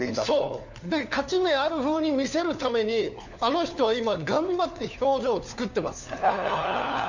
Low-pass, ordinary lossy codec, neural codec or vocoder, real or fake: 7.2 kHz; none; codec, 16 kHz, 4 kbps, FunCodec, trained on LibriTTS, 50 frames a second; fake